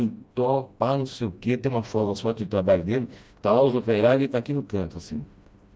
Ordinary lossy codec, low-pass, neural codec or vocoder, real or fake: none; none; codec, 16 kHz, 1 kbps, FreqCodec, smaller model; fake